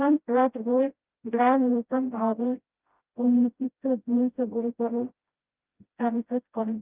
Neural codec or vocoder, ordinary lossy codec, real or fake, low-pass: codec, 16 kHz, 0.5 kbps, FreqCodec, smaller model; Opus, 24 kbps; fake; 3.6 kHz